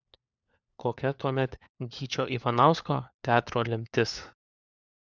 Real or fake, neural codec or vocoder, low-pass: fake; codec, 16 kHz, 4 kbps, FunCodec, trained on LibriTTS, 50 frames a second; 7.2 kHz